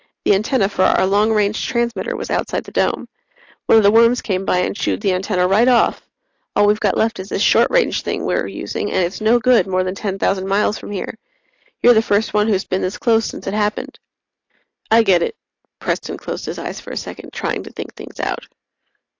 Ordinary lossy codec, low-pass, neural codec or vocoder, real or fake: AAC, 48 kbps; 7.2 kHz; none; real